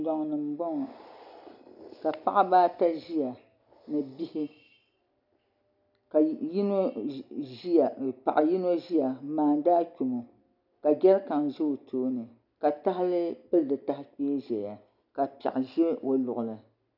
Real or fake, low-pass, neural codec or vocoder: real; 5.4 kHz; none